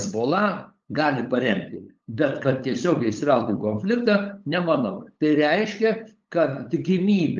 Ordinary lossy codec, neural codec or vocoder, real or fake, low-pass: Opus, 24 kbps; codec, 16 kHz, 8 kbps, FunCodec, trained on LibriTTS, 25 frames a second; fake; 7.2 kHz